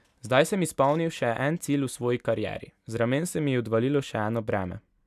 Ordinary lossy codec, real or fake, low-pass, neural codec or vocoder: none; real; 14.4 kHz; none